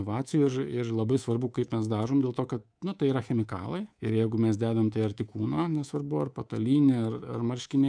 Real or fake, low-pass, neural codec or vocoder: fake; 9.9 kHz; codec, 24 kHz, 3.1 kbps, DualCodec